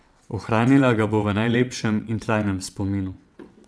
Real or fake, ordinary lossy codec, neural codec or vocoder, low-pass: fake; none; vocoder, 22.05 kHz, 80 mel bands, WaveNeXt; none